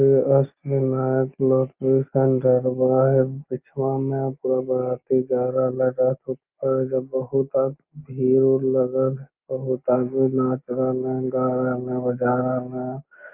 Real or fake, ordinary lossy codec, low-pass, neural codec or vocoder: real; Opus, 24 kbps; 3.6 kHz; none